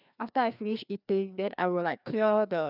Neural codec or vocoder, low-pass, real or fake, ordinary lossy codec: codec, 16 kHz, 2 kbps, FreqCodec, larger model; 5.4 kHz; fake; none